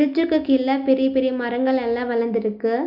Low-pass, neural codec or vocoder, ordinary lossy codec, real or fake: 5.4 kHz; none; none; real